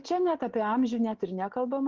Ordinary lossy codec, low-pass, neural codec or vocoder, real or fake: Opus, 16 kbps; 7.2 kHz; none; real